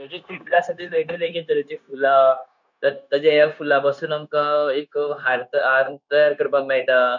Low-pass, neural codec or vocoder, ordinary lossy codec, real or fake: 7.2 kHz; codec, 16 kHz, 0.9 kbps, LongCat-Audio-Codec; none; fake